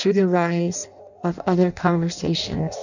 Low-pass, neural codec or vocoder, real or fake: 7.2 kHz; codec, 16 kHz in and 24 kHz out, 0.6 kbps, FireRedTTS-2 codec; fake